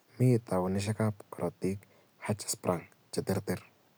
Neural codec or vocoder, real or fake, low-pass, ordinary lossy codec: none; real; none; none